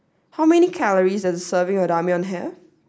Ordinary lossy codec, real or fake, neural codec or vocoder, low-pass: none; real; none; none